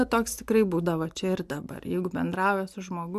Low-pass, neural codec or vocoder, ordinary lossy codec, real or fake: 14.4 kHz; none; MP3, 96 kbps; real